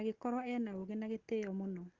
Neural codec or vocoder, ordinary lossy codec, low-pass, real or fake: none; Opus, 16 kbps; 7.2 kHz; real